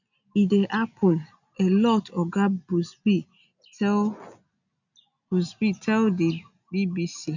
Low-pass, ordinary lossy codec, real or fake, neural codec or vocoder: 7.2 kHz; none; real; none